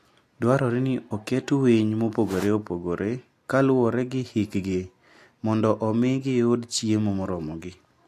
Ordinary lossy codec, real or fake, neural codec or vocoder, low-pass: AAC, 64 kbps; real; none; 14.4 kHz